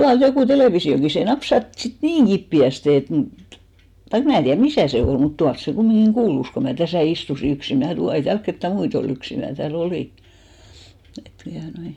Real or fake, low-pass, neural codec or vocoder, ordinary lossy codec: real; 19.8 kHz; none; none